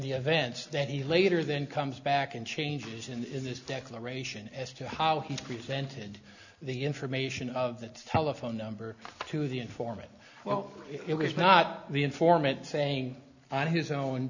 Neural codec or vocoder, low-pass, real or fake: none; 7.2 kHz; real